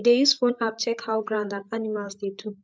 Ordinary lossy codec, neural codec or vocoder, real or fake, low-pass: none; codec, 16 kHz, 8 kbps, FreqCodec, larger model; fake; none